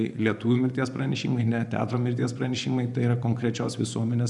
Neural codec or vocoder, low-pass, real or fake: vocoder, 24 kHz, 100 mel bands, Vocos; 10.8 kHz; fake